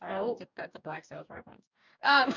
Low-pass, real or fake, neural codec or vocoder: 7.2 kHz; fake; codec, 44.1 kHz, 2.6 kbps, DAC